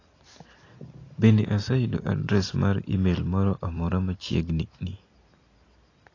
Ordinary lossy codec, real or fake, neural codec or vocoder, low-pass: AAC, 32 kbps; real; none; 7.2 kHz